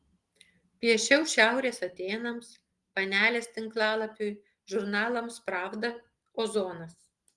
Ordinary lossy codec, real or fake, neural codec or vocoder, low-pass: Opus, 24 kbps; real; none; 10.8 kHz